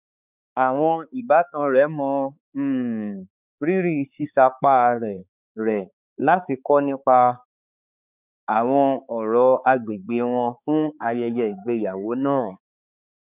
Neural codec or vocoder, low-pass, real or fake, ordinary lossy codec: codec, 16 kHz, 4 kbps, X-Codec, HuBERT features, trained on balanced general audio; 3.6 kHz; fake; none